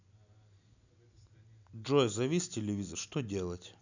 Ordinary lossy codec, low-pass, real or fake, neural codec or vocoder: none; 7.2 kHz; real; none